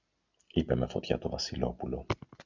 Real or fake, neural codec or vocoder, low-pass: real; none; 7.2 kHz